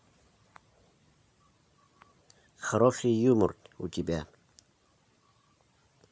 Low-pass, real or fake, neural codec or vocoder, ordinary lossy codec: none; real; none; none